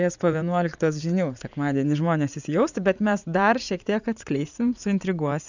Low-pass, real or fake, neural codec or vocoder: 7.2 kHz; fake; vocoder, 44.1 kHz, 80 mel bands, Vocos